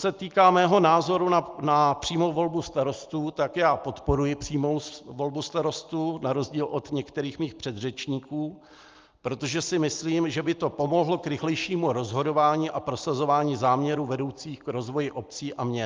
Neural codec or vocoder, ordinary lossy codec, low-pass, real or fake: none; Opus, 24 kbps; 7.2 kHz; real